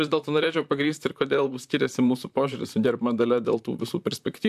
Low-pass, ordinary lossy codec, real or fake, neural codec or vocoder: 14.4 kHz; AAC, 96 kbps; fake; vocoder, 44.1 kHz, 128 mel bands, Pupu-Vocoder